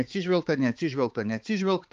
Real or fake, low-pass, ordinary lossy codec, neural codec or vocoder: fake; 7.2 kHz; Opus, 24 kbps; codec, 16 kHz, 4 kbps, X-Codec, HuBERT features, trained on balanced general audio